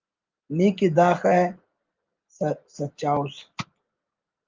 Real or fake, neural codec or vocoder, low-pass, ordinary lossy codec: real; none; 7.2 kHz; Opus, 32 kbps